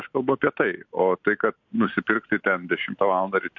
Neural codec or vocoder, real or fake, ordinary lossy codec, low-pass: none; real; MP3, 48 kbps; 7.2 kHz